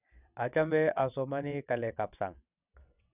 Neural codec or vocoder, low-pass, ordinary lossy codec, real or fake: vocoder, 22.05 kHz, 80 mel bands, WaveNeXt; 3.6 kHz; none; fake